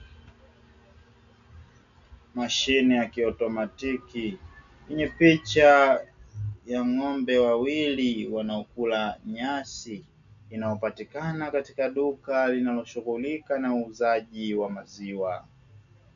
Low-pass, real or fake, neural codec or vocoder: 7.2 kHz; real; none